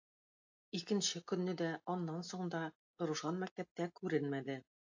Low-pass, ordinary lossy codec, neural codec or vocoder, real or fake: 7.2 kHz; AAC, 48 kbps; none; real